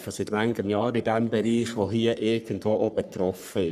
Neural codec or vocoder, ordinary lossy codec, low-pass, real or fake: codec, 44.1 kHz, 3.4 kbps, Pupu-Codec; none; 14.4 kHz; fake